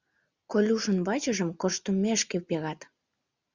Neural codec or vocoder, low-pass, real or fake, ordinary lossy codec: none; 7.2 kHz; real; Opus, 64 kbps